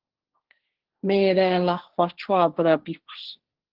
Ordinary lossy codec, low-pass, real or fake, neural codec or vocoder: Opus, 16 kbps; 5.4 kHz; fake; codec, 16 kHz, 1.1 kbps, Voila-Tokenizer